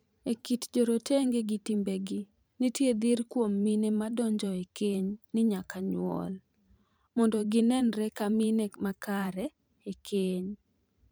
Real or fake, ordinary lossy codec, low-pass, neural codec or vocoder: fake; none; none; vocoder, 44.1 kHz, 128 mel bands every 512 samples, BigVGAN v2